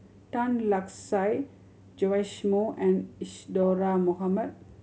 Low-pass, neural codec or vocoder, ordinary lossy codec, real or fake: none; none; none; real